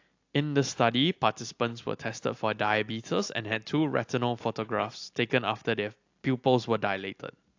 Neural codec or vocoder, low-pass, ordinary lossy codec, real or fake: none; 7.2 kHz; AAC, 48 kbps; real